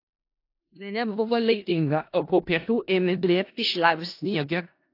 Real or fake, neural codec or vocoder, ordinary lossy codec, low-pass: fake; codec, 16 kHz in and 24 kHz out, 0.4 kbps, LongCat-Audio-Codec, four codebook decoder; AAC, 32 kbps; 5.4 kHz